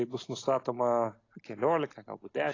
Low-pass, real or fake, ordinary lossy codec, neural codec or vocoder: 7.2 kHz; real; AAC, 32 kbps; none